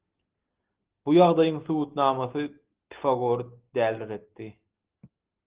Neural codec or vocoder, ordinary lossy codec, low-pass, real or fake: none; Opus, 16 kbps; 3.6 kHz; real